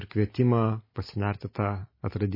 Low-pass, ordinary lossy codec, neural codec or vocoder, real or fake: 5.4 kHz; MP3, 24 kbps; none; real